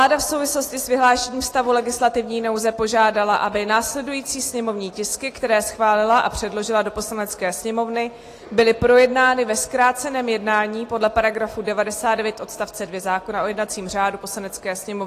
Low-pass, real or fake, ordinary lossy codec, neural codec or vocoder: 14.4 kHz; real; AAC, 48 kbps; none